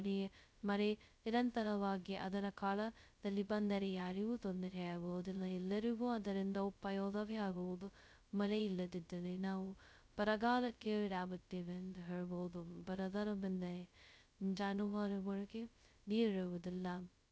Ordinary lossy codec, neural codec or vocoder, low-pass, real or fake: none; codec, 16 kHz, 0.2 kbps, FocalCodec; none; fake